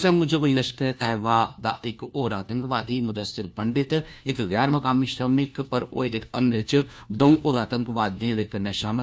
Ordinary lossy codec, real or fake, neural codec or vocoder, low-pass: none; fake; codec, 16 kHz, 1 kbps, FunCodec, trained on LibriTTS, 50 frames a second; none